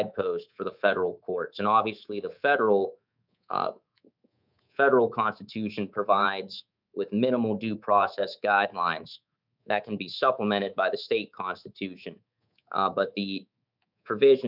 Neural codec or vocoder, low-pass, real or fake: codec, 24 kHz, 3.1 kbps, DualCodec; 5.4 kHz; fake